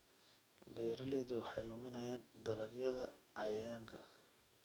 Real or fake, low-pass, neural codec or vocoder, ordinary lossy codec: fake; none; codec, 44.1 kHz, 2.6 kbps, DAC; none